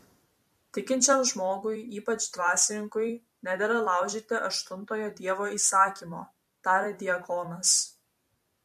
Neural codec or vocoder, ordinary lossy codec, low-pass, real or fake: vocoder, 44.1 kHz, 128 mel bands every 256 samples, BigVGAN v2; MP3, 64 kbps; 14.4 kHz; fake